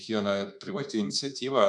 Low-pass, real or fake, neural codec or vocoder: 10.8 kHz; fake; codec, 24 kHz, 1.2 kbps, DualCodec